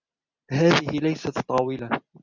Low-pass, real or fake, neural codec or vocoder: 7.2 kHz; real; none